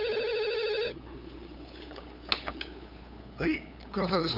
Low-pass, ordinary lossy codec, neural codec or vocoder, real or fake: 5.4 kHz; none; codec, 16 kHz, 8 kbps, FunCodec, trained on LibriTTS, 25 frames a second; fake